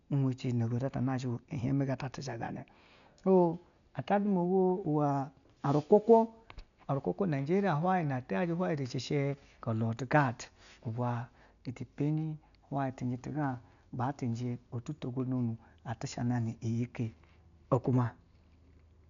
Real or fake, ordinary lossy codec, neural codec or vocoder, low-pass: real; none; none; 7.2 kHz